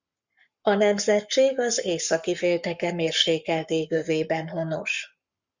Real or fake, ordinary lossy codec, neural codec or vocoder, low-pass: fake; Opus, 64 kbps; codec, 44.1 kHz, 7.8 kbps, Pupu-Codec; 7.2 kHz